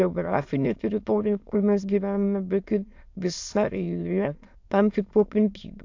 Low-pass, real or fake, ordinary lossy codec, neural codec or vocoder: 7.2 kHz; fake; AAC, 48 kbps; autoencoder, 22.05 kHz, a latent of 192 numbers a frame, VITS, trained on many speakers